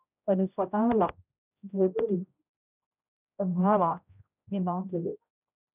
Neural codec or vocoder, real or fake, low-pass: codec, 16 kHz, 0.5 kbps, X-Codec, HuBERT features, trained on general audio; fake; 3.6 kHz